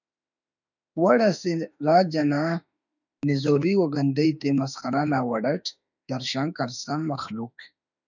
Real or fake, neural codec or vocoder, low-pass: fake; autoencoder, 48 kHz, 32 numbers a frame, DAC-VAE, trained on Japanese speech; 7.2 kHz